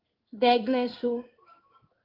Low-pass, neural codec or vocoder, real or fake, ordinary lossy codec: 5.4 kHz; codec, 16 kHz in and 24 kHz out, 1 kbps, XY-Tokenizer; fake; Opus, 32 kbps